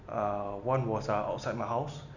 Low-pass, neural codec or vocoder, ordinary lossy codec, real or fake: 7.2 kHz; none; none; real